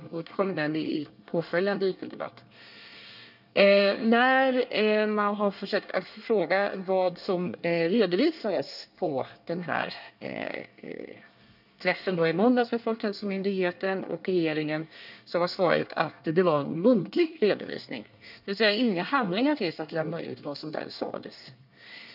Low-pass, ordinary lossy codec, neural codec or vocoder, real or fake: 5.4 kHz; none; codec, 24 kHz, 1 kbps, SNAC; fake